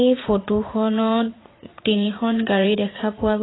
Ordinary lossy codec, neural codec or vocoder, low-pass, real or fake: AAC, 16 kbps; vocoder, 44.1 kHz, 80 mel bands, Vocos; 7.2 kHz; fake